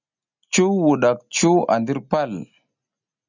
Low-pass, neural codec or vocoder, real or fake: 7.2 kHz; none; real